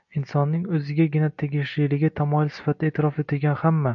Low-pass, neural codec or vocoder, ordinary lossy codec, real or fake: 7.2 kHz; none; AAC, 64 kbps; real